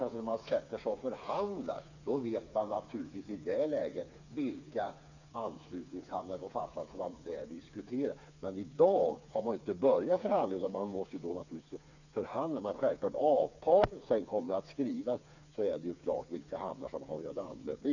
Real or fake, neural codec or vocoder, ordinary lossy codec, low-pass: fake; codec, 16 kHz, 4 kbps, FreqCodec, smaller model; none; 7.2 kHz